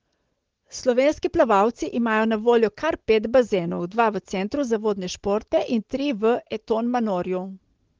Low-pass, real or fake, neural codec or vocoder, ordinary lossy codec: 7.2 kHz; real; none; Opus, 16 kbps